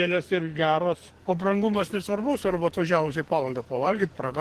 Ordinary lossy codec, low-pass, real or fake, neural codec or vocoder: Opus, 16 kbps; 14.4 kHz; fake; codec, 44.1 kHz, 2.6 kbps, SNAC